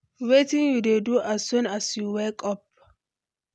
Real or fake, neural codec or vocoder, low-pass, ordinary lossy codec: real; none; none; none